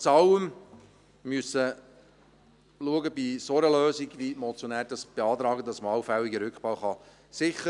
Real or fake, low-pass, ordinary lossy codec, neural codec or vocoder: real; 10.8 kHz; none; none